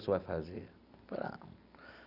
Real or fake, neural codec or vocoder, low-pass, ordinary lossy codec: real; none; 5.4 kHz; none